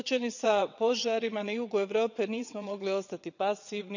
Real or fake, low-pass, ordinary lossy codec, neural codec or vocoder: fake; 7.2 kHz; none; vocoder, 22.05 kHz, 80 mel bands, WaveNeXt